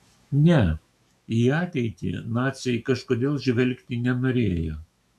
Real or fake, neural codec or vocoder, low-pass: fake; autoencoder, 48 kHz, 128 numbers a frame, DAC-VAE, trained on Japanese speech; 14.4 kHz